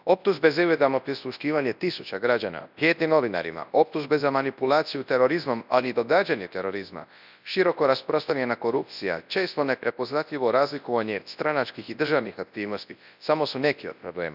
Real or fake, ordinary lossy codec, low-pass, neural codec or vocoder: fake; none; 5.4 kHz; codec, 24 kHz, 0.9 kbps, WavTokenizer, large speech release